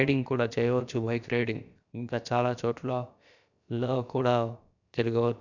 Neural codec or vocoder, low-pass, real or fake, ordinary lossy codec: codec, 16 kHz, about 1 kbps, DyCAST, with the encoder's durations; 7.2 kHz; fake; none